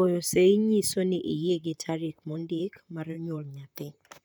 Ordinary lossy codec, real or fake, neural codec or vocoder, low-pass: none; fake; vocoder, 44.1 kHz, 128 mel bands, Pupu-Vocoder; none